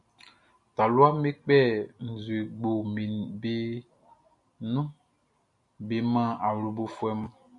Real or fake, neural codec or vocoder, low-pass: real; none; 10.8 kHz